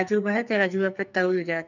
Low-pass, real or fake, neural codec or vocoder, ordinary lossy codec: 7.2 kHz; fake; codec, 44.1 kHz, 2.6 kbps, SNAC; none